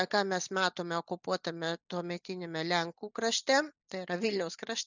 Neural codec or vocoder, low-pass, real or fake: none; 7.2 kHz; real